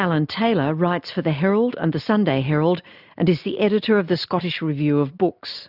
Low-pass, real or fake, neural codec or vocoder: 5.4 kHz; real; none